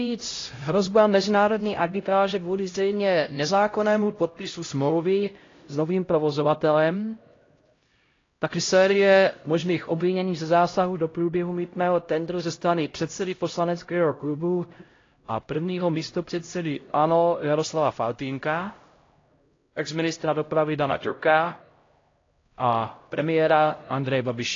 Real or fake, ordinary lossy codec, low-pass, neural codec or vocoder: fake; AAC, 32 kbps; 7.2 kHz; codec, 16 kHz, 0.5 kbps, X-Codec, HuBERT features, trained on LibriSpeech